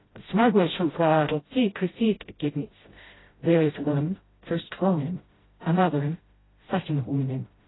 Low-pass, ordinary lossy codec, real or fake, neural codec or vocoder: 7.2 kHz; AAC, 16 kbps; fake; codec, 16 kHz, 0.5 kbps, FreqCodec, smaller model